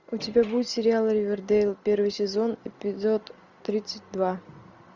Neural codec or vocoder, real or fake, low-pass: none; real; 7.2 kHz